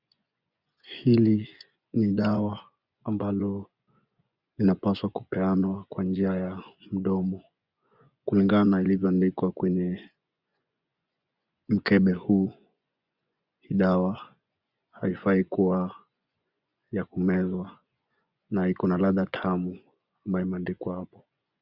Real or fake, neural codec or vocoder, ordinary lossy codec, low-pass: fake; vocoder, 24 kHz, 100 mel bands, Vocos; Opus, 64 kbps; 5.4 kHz